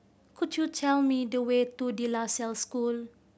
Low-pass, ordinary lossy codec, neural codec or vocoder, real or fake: none; none; none; real